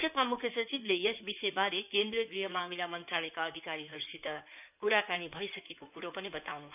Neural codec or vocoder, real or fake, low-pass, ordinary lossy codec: codec, 16 kHz in and 24 kHz out, 2.2 kbps, FireRedTTS-2 codec; fake; 3.6 kHz; none